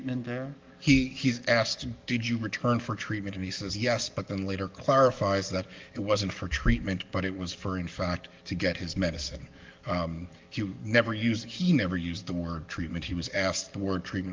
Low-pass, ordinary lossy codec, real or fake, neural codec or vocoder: 7.2 kHz; Opus, 32 kbps; fake; codec, 44.1 kHz, 7.8 kbps, DAC